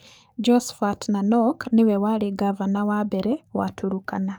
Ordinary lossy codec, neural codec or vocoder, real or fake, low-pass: none; codec, 44.1 kHz, 7.8 kbps, DAC; fake; none